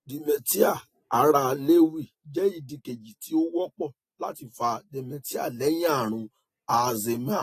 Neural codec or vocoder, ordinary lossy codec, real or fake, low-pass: none; AAC, 48 kbps; real; 14.4 kHz